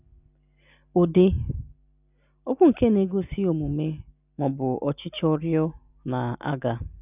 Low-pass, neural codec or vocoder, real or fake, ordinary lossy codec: 3.6 kHz; none; real; none